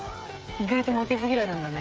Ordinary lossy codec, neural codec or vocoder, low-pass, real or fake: none; codec, 16 kHz, 8 kbps, FreqCodec, smaller model; none; fake